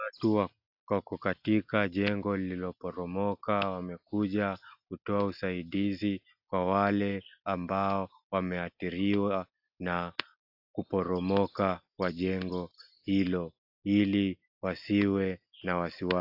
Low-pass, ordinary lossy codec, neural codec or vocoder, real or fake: 5.4 kHz; AAC, 48 kbps; none; real